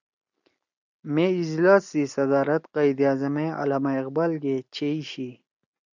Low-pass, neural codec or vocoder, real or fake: 7.2 kHz; none; real